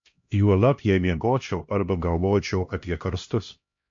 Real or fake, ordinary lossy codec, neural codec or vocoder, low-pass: fake; MP3, 48 kbps; codec, 16 kHz, 1 kbps, X-Codec, HuBERT features, trained on LibriSpeech; 7.2 kHz